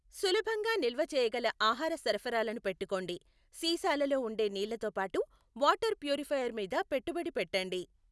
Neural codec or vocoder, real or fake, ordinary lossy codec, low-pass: none; real; none; none